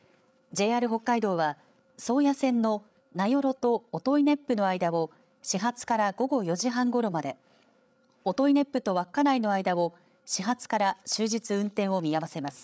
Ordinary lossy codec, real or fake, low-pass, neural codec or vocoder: none; fake; none; codec, 16 kHz, 8 kbps, FreqCodec, larger model